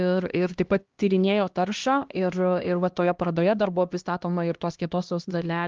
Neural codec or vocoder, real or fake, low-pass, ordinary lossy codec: codec, 16 kHz, 1 kbps, X-Codec, HuBERT features, trained on LibriSpeech; fake; 7.2 kHz; Opus, 32 kbps